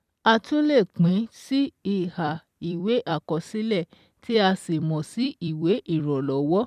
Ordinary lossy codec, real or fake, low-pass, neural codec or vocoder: none; fake; 14.4 kHz; vocoder, 44.1 kHz, 128 mel bands every 256 samples, BigVGAN v2